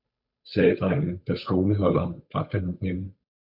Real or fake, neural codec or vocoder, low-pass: fake; codec, 16 kHz, 8 kbps, FunCodec, trained on Chinese and English, 25 frames a second; 5.4 kHz